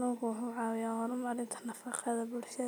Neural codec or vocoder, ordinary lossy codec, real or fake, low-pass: none; none; real; none